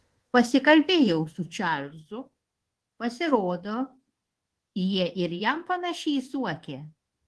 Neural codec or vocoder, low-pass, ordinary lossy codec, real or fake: codec, 24 kHz, 1.2 kbps, DualCodec; 10.8 kHz; Opus, 16 kbps; fake